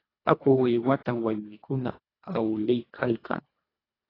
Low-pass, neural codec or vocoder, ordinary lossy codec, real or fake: 5.4 kHz; codec, 24 kHz, 1.5 kbps, HILCodec; AAC, 24 kbps; fake